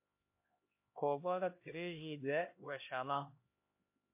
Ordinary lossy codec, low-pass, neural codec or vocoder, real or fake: MP3, 24 kbps; 3.6 kHz; codec, 16 kHz, 1 kbps, X-Codec, HuBERT features, trained on LibriSpeech; fake